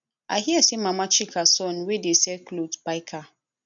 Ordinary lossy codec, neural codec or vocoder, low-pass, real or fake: none; none; 7.2 kHz; real